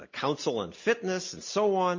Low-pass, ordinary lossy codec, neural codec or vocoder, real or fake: 7.2 kHz; MP3, 32 kbps; none; real